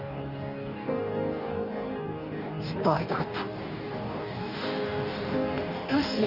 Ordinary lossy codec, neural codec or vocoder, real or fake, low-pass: Opus, 32 kbps; codec, 44.1 kHz, 2.6 kbps, DAC; fake; 5.4 kHz